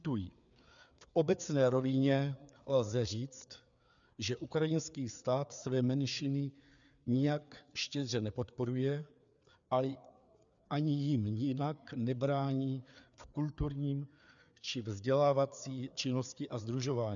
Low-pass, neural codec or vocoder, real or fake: 7.2 kHz; codec, 16 kHz, 4 kbps, FreqCodec, larger model; fake